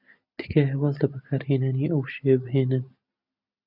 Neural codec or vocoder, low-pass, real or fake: none; 5.4 kHz; real